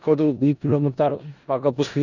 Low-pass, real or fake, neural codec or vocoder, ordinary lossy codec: 7.2 kHz; fake; codec, 16 kHz in and 24 kHz out, 0.4 kbps, LongCat-Audio-Codec, four codebook decoder; none